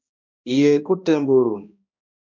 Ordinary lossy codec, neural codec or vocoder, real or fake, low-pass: MP3, 64 kbps; codec, 16 kHz, 1 kbps, X-Codec, HuBERT features, trained on balanced general audio; fake; 7.2 kHz